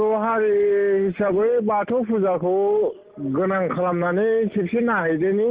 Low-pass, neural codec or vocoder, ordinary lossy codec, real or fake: 3.6 kHz; none; Opus, 16 kbps; real